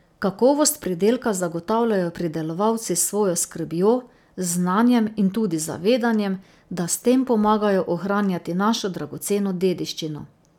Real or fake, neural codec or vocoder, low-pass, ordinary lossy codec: real; none; 19.8 kHz; none